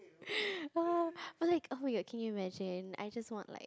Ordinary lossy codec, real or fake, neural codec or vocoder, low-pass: none; real; none; none